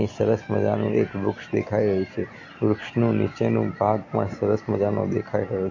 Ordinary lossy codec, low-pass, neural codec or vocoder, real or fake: AAC, 48 kbps; 7.2 kHz; none; real